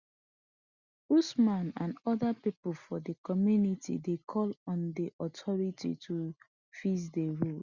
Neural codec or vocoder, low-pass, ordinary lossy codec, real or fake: none; 7.2 kHz; Opus, 64 kbps; real